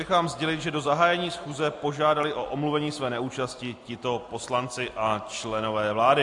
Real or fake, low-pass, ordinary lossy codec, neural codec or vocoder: real; 10.8 kHz; AAC, 64 kbps; none